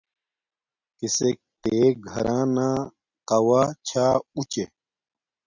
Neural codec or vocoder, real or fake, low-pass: none; real; 7.2 kHz